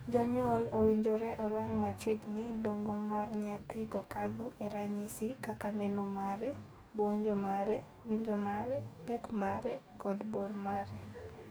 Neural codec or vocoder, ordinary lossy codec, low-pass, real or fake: codec, 44.1 kHz, 2.6 kbps, DAC; none; none; fake